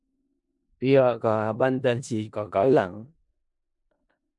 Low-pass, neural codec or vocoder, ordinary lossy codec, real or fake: 10.8 kHz; codec, 16 kHz in and 24 kHz out, 0.4 kbps, LongCat-Audio-Codec, four codebook decoder; MP3, 64 kbps; fake